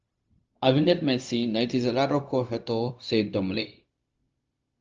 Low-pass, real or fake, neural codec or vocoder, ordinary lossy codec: 7.2 kHz; fake; codec, 16 kHz, 0.4 kbps, LongCat-Audio-Codec; Opus, 24 kbps